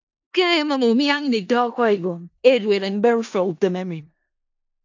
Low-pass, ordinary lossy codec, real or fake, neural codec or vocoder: 7.2 kHz; AAC, 48 kbps; fake; codec, 16 kHz in and 24 kHz out, 0.4 kbps, LongCat-Audio-Codec, four codebook decoder